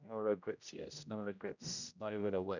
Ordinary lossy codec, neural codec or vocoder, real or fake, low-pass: none; codec, 16 kHz, 0.5 kbps, X-Codec, HuBERT features, trained on general audio; fake; 7.2 kHz